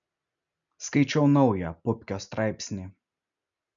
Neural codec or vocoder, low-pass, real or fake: none; 7.2 kHz; real